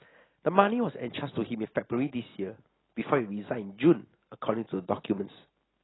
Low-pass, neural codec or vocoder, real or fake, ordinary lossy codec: 7.2 kHz; none; real; AAC, 16 kbps